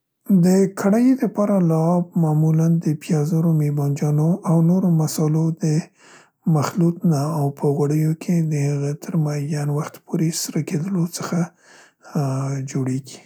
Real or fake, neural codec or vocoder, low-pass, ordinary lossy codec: real; none; none; none